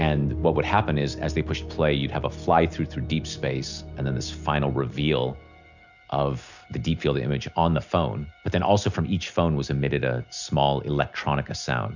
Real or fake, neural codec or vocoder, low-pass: real; none; 7.2 kHz